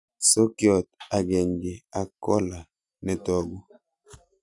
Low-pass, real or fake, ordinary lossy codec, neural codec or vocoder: 10.8 kHz; real; none; none